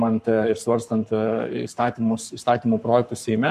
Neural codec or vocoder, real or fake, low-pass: codec, 44.1 kHz, 7.8 kbps, Pupu-Codec; fake; 14.4 kHz